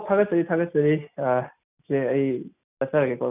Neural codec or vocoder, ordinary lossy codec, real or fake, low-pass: none; none; real; 3.6 kHz